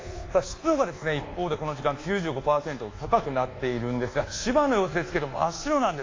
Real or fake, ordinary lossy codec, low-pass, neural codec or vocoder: fake; AAC, 32 kbps; 7.2 kHz; codec, 24 kHz, 1.2 kbps, DualCodec